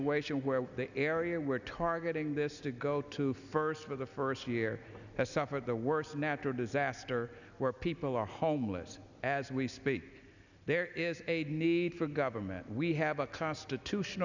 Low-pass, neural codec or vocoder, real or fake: 7.2 kHz; none; real